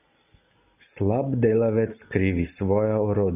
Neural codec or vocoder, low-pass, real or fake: none; 3.6 kHz; real